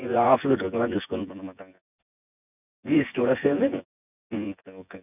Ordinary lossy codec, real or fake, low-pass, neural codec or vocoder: none; fake; 3.6 kHz; vocoder, 24 kHz, 100 mel bands, Vocos